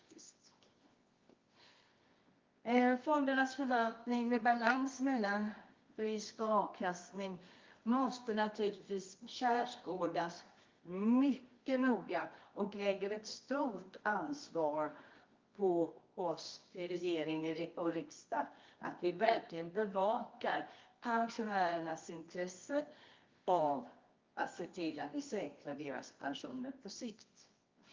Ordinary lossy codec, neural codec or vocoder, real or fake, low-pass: Opus, 32 kbps; codec, 24 kHz, 0.9 kbps, WavTokenizer, medium music audio release; fake; 7.2 kHz